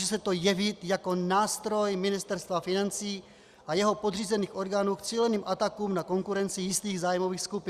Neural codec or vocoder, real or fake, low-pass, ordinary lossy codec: none; real; 14.4 kHz; Opus, 64 kbps